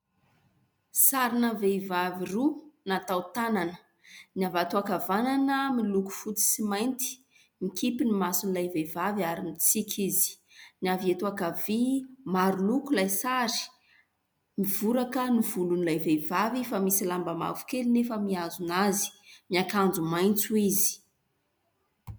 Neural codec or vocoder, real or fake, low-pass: none; real; 19.8 kHz